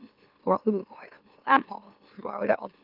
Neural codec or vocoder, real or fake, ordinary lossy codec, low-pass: autoencoder, 44.1 kHz, a latent of 192 numbers a frame, MeloTTS; fake; Opus, 32 kbps; 5.4 kHz